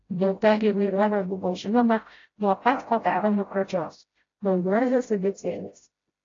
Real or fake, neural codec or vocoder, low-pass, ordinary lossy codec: fake; codec, 16 kHz, 0.5 kbps, FreqCodec, smaller model; 7.2 kHz; AAC, 32 kbps